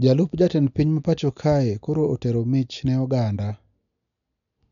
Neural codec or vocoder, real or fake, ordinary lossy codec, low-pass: none; real; none; 7.2 kHz